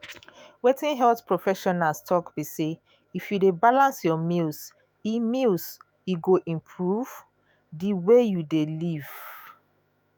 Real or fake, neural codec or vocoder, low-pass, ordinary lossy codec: fake; autoencoder, 48 kHz, 128 numbers a frame, DAC-VAE, trained on Japanese speech; none; none